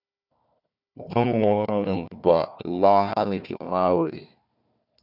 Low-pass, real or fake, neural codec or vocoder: 5.4 kHz; fake; codec, 16 kHz, 1 kbps, FunCodec, trained on Chinese and English, 50 frames a second